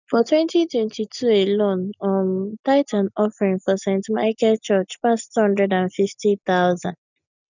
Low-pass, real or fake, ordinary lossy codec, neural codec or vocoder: 7.2 kHz; real; none; none